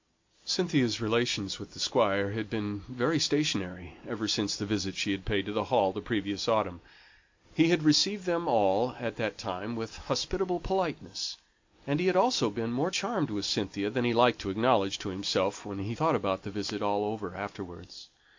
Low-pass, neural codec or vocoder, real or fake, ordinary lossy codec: 7.2 kHz; none; real; MP3, 48 kbps